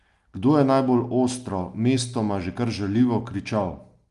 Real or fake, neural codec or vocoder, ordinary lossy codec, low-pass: real; none; Opus, 32 kbps; 10.8 kHz